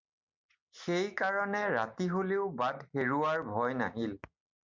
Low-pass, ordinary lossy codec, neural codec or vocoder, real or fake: 7.2 kHz; MP3, 64 kbps; none; real